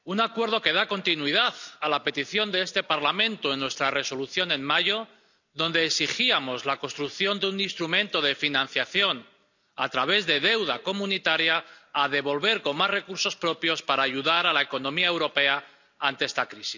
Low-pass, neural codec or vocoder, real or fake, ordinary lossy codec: 7.2 kHz; none; real; none